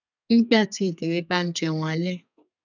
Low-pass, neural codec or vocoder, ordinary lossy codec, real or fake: 7.2 kHz; codec, 32 kHz, 1.9 kbps, SNAC; none; fake